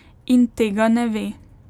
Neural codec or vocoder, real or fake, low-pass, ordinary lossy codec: none; real; 19.8 kHz; none